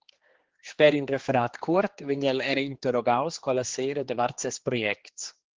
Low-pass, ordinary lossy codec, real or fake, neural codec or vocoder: 7.2 kHz; Opus, 16 kbps; fake; codec, 16 kHz, 2 kbps, X-Codec, HuBERT features, trained on general audio